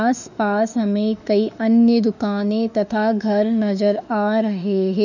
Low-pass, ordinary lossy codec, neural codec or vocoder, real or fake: 7.2 kHz; none; autoencoder, 48 kHz, 32 numbers a frame, DAC-VAE, trained on Japanese speech; fake